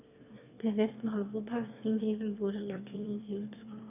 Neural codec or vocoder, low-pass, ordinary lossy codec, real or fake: autoencoder, 22.05 kHz, a latent of 192 numbers a frame, VITS, trained on one speaker; 3.6 kHz; none; fake